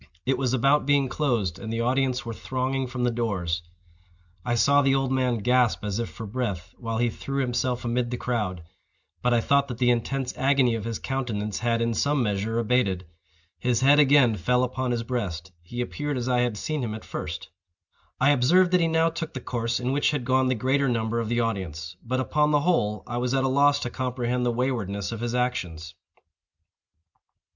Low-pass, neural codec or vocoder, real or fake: 7.2 kHz; none; real